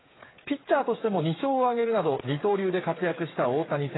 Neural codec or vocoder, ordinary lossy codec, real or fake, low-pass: codec, 16 kHz, 8 kbps, FreqCodec, smaller model; AAC, 16 kbps; fake; 7.2 kHz